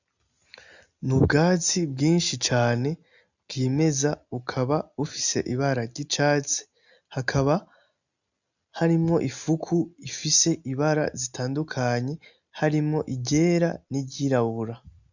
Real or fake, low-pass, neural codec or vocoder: real; 7.2 kHz; none